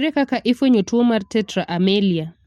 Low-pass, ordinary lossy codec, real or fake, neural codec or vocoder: 19.8 kHz; MP3, 64 kbps; real; none